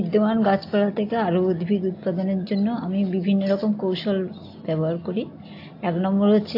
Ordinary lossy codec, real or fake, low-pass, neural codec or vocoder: AAC, 32 kbps; real; 5.4 kHz; none